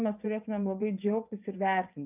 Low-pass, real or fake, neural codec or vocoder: 3.6 kHz; fake; vocoder, 22.05 kHz, 80 mel bands, WaveNeXt